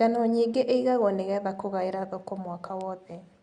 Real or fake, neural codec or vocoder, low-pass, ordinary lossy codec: real; none; 9.9 kHz; Opus, 64 kbps